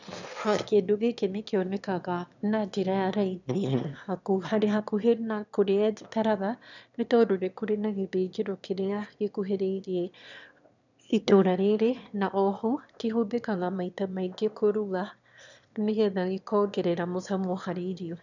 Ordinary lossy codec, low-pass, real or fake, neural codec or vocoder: none; 7.2 kHz; fake; autoencoder, 22.05 kHz, a latent of 192 numbers a frame, VITS, trained on one speaker